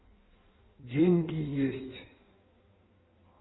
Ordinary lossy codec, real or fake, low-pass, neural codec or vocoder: AAC, 16 kbps; fake; 7.2 kHz; codec, 16 kHz in and 24 kHz out, 1.1 kbps, FireRedTTS-2 codec